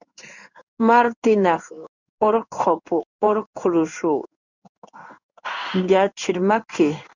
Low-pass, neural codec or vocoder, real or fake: 7.2 kHz; codec, 16 kHz in and 24 kHz out, 1 kbps, XY-Tokenizer; fake